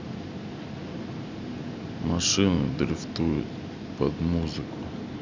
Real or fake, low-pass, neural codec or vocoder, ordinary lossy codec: real; 7.2 kHz; none; MP3, 64 kbps